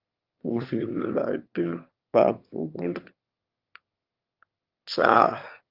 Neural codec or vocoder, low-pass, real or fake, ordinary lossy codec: autoencoder, 22.05 kHz, a latent of 192 numbers a frame, VITS, trained on one speaker; 5.4 kHz; fake; Opus, 24 kbps